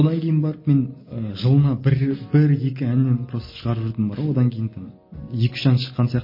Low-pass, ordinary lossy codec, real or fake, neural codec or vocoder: 5.4 kHz; MP3, 24 kbps; real; none